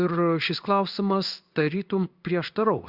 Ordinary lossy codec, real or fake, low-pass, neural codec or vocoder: Opus, 64 kbps; fake; 5.4 kHz; codec, 16 kHz in and 24 kHz out, 1 kbps, XY-Tokenizer